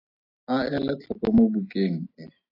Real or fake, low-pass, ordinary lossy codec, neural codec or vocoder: real; 5.4 kHz; AAC, 24 kbps; none